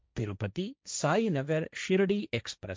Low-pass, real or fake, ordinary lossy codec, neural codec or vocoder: none; fake; none; codec, 16 kHz, 1.1 kbps, Voila-Tokenizer